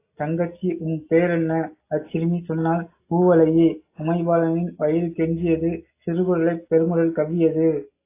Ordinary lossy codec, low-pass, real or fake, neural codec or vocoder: AAC, 24 kbps; 3.6 kHz; real; none